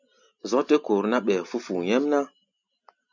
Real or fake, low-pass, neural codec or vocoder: real; 7.2 kHz; none